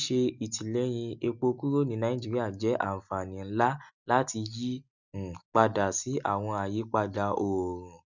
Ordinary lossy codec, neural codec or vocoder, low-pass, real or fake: none; none; 7.2 kHz; real